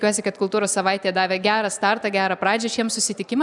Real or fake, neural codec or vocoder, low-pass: real; none; 10.8 kHz